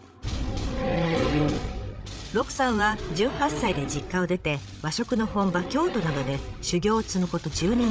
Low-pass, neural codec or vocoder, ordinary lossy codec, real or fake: none; codec, 16 kHz, 8 kbps, FreqCodec, larger model; none; fake